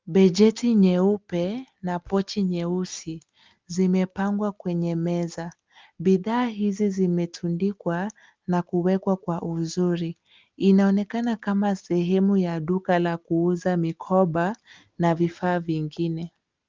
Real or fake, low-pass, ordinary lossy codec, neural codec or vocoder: real; 7.2 kHz; Opus, 24 kbps; none